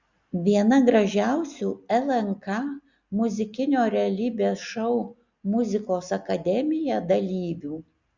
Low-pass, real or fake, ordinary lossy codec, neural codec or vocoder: 7.2 kHz; real; Opus, 64 kbps; none